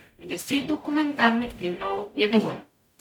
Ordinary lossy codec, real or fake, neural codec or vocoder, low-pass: none; fake; codec, 44.1 kHz, 0.9 kbps, DAC; 19.8 kHz